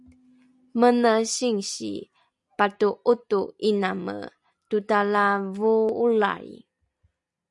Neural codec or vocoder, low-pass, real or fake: none; 10.8 kHz; real